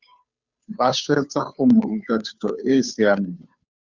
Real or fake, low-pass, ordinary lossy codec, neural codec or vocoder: fake; 7.2 kHz; Opus, 64 kbps; codec, 16 kHz, 2 kbps, FunCodec, trained on Chinese and English, 25 frames a second